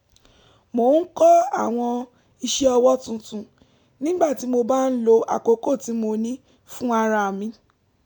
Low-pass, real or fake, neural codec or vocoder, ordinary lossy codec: 19.8 kHz; real; none; none